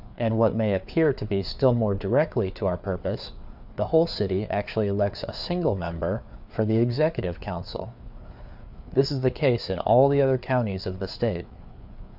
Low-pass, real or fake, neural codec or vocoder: 5.4 kHz; fake; codec, 16 kHz, 4 kbps, FreqCodec, larger model